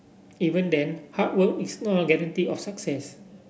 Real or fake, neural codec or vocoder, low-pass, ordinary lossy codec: real; none; none; none